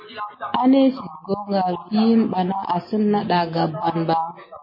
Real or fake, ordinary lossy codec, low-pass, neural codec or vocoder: real; MP3, 24 kbps; 5.4 kHz; none